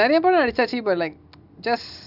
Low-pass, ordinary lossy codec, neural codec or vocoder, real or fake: 5.4 kHz; none; none; real